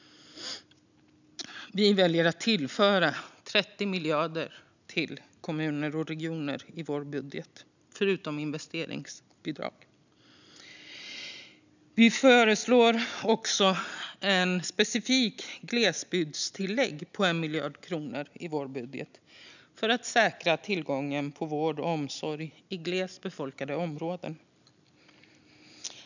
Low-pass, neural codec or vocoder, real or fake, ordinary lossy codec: 7.2 kHz; none; real; none